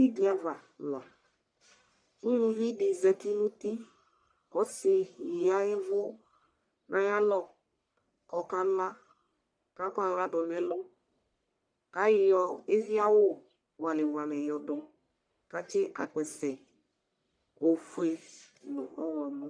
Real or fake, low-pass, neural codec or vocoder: fake; 9.9 kHz; codec, 44.1 kHz, 1.7 kbps, Pupu-Codec